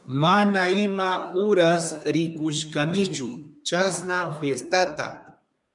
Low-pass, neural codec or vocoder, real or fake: 10.8 kHz; codec, 24 kHz, 1 kbps, SNAC; fake